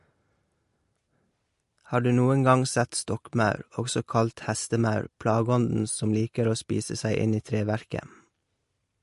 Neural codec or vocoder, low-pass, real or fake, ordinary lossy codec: none; 14.4 kHz; real; MP3, 48 kbps